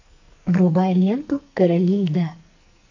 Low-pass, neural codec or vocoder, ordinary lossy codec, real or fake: 7.2 kHz; codec, 44.1 kHz, 2.6 kbps, SNAC; AAC, 48 kbps; fake